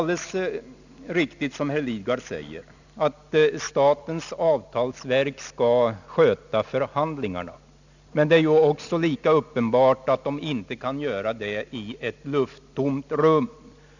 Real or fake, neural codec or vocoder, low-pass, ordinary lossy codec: real; none; 7.2 kHz; none